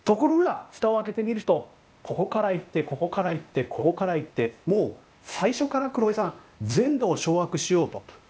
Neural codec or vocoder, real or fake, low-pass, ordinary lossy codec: codec, 16 kHz, 0.8 kbps, ZipCodec; fake; none; none